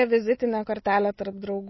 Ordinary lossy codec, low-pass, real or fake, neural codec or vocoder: MP3, 24 kbps; 7.2 kHz; real; none